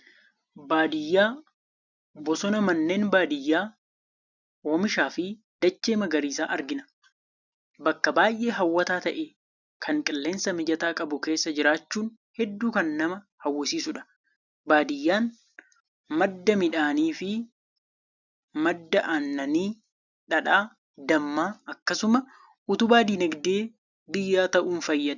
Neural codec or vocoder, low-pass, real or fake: none; 7.2 kHz; real